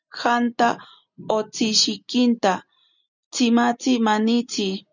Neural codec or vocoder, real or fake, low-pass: none; real; 7.2 kHz